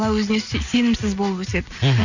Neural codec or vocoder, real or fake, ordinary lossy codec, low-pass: none; real; none; 7.2 kHz